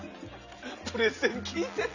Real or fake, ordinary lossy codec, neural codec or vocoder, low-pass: real; none; none; 7.2 kHz